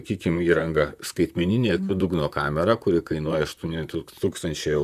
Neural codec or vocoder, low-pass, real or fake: vocoder, 44.1 kHz, 128 mel bands, Pupu-Vocoder; 14.4 kHz; fake